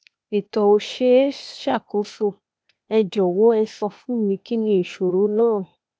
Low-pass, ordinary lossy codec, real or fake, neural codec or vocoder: none; none; fake; codec, 16 kHz, 0.8 kbps, ZipCodec